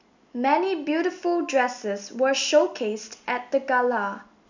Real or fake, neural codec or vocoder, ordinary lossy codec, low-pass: real; none; none; 7.2 kHz